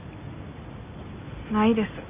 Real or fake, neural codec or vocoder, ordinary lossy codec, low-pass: real; none; Opus, 64 kbps; 3.6 kHz